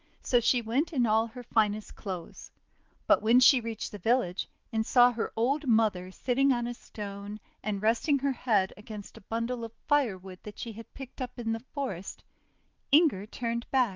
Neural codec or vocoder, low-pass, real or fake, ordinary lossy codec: none; 7.2 kHz; real; Opus, 24 kbps